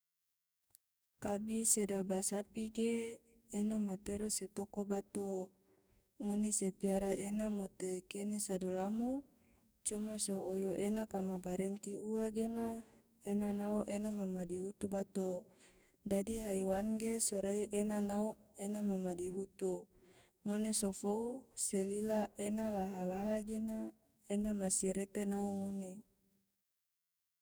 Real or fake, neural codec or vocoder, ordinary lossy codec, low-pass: fake; codec, 44.1 kHz, 2.6 kbps, DAC; none; none